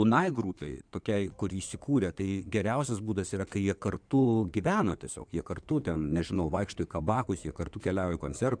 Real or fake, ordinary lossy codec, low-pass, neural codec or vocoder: fake; MP3, 96 kbps; 9.9 kHz; codec, 16 kHz in and 24 kHz out, 2.2 kbps, FireRedTTS-2 codec